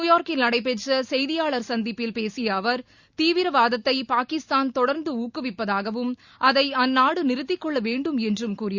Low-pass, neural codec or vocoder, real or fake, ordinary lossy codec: 7.2 kHz; none; real; Opus, 64 kbps